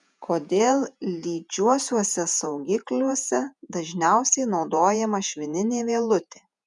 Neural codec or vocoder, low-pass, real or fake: vocoder, 48 kHz, 128 mel bands, Vocos; 10.8 kHz; fake